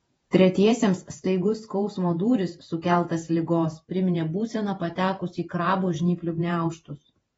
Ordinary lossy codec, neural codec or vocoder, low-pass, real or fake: AAC, 24 kbps; vocoder, 48 kHz, 128 mel bands, Vocos; 19.8 kHz; fake